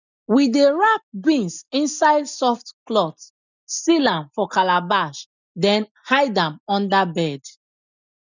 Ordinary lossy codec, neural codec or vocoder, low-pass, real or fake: none; none; 7.2 kHz; real